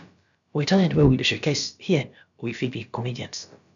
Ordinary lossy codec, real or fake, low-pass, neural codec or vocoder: MP3, 96 kbps; fake; 7.2 kHz; codec, 16 kHz, about 1 kbps, DyCAST, with the encoder's durations